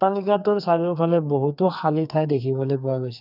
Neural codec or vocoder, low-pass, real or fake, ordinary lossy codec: codec, 44.1 kHz, 2.6 kbps, SNAC; 5.4 kHz; fake; none